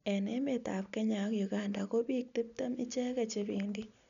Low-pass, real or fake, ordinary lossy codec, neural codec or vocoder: 7.2 kHz; real; none; none